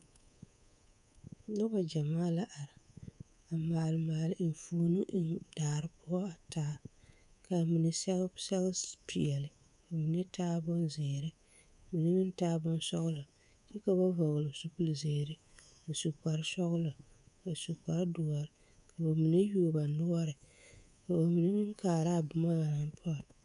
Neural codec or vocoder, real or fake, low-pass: codec, 24 kHz, 3.1 kbps, DualCodec; fake; 10.8 kHz